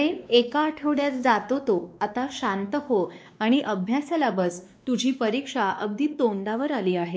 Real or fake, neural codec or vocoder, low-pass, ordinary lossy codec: fake; codec, 16 kHz, 2 kbps, X-Codec, WavLM features, trained on Multilingual LibriSpeech; none; none